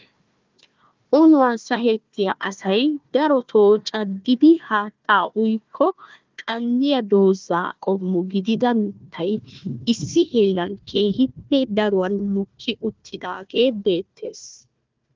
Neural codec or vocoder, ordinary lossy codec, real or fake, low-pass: codec, 16 kHz, 1 kbps, FunCodec, trained on Chinese and English, 50 frames a second; Opus, 24 kbps; fake; 7.2 kHz